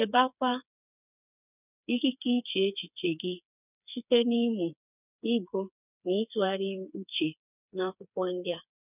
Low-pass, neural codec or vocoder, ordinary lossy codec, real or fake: 3.6 kHz; codec, 16 kHz, 4 kbps, FreqCodec, smaller model; none; fake